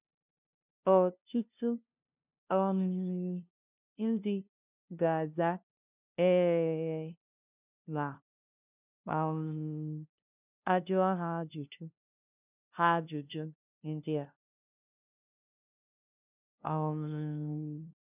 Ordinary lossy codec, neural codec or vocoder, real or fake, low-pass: none; codec, 16 kHz, 0.5 kbps, FunCodec, trained on LibriTTS, 25 frames a second; fake; 3.6 kHz